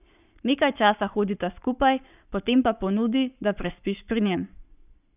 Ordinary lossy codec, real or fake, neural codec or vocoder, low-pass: none; fake; codec, 44.1 kHz, 7.8 kbps, Pupu-Codec; 3.6 kHz